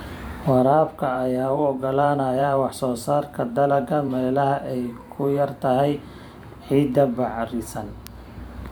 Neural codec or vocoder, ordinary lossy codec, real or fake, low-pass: vocoder, 44.1 kHz, 128 mel bands every 512 samples, BigVGAN v2; none; fake; none